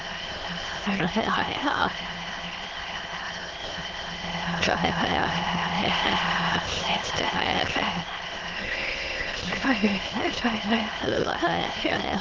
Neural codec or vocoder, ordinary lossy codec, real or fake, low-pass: autoencoder, 22.05 kHz, a latent of 192 numbers a frame, VITS, trained on many speakers; Opus, 16 kbps; fake; 7.2 kHz